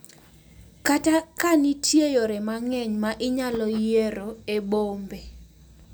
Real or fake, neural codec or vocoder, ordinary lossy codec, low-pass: real; none; none; none